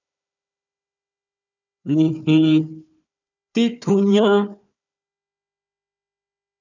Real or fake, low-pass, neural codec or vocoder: fake; 7.2 kHz; codec, 16 kHz, 4 kbps, FunCodec, trained on Chinese and English, 50 frames a second